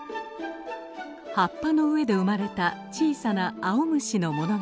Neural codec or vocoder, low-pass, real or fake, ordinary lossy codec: none; none; real; none